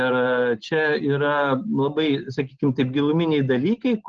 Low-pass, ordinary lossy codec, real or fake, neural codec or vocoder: 7.2 kHz; Opus, 16 kbps; real; none